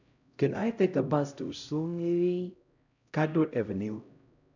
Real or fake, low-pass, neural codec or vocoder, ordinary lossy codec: fake; 7.2 kHz; codec, 16 kHz, 0.5 kbps, X-Codec, HuBERT features, trained on LibriSpeech; none